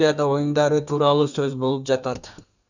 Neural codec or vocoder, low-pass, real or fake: codec, 32 kHz, 1.9 kbps, SNAC; 7.2 kHz; fake